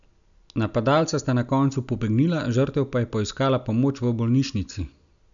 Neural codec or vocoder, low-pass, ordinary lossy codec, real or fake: none; 7.2 kHz; none; real